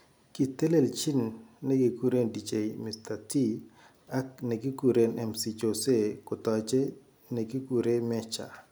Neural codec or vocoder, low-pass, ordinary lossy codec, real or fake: none; none; none; real